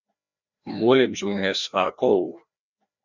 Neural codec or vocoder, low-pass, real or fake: codec, 16 kHz, 1 kbps, FreqCodec, larger model; 7.2 kHz; fake